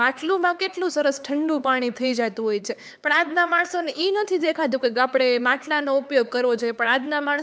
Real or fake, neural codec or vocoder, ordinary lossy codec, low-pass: fake; codec, 16 kHz, 4 kbps, X-Codec, HuBERT features, trained on LibriSpeech; none; none